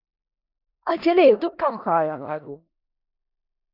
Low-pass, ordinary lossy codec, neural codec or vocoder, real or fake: 5.4 kHz; AAC, 48 kbps; codec, 16 kHz in and 24 kHz out, 0.4 kbps, LongCat-Audio-Codec, four codebook decoder; fake